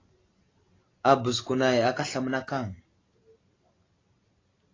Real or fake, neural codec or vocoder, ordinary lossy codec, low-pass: real; none; AAC, 32 kbps; 7.2 kHz